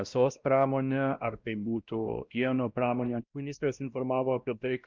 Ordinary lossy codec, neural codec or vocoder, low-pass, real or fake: Opus, 24 kbps; codec, 16 kHz, 1 kbps, X-Codec, WavLM features, trained on Multilingual LibriSpeech; 7.2 kHz; fake